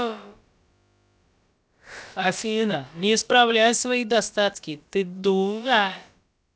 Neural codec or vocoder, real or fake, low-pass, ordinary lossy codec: codec, 16 kHz, about 1 kbps, DyCAST, with the encoder's durations; fake; none; none